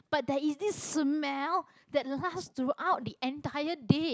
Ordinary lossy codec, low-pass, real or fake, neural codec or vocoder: none; none; real; none